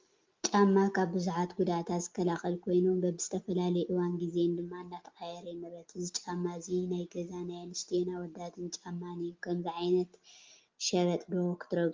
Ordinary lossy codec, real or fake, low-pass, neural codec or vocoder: Opus, 32 kbps; real; 7.2 kHz; none